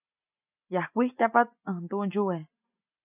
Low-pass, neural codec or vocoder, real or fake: 3.6 kHz; none; real